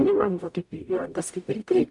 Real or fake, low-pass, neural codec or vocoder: fake; 10.8 kHz; codec, 44.1 kHz, 0.9 kbps, DAC